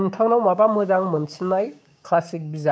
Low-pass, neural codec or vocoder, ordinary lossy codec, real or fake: none; codec, 16 kHz, 6 kbps, DAC; none; fake